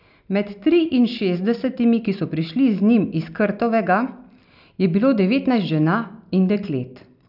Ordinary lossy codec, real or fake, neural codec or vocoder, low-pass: none; real; none; 5.4 kHz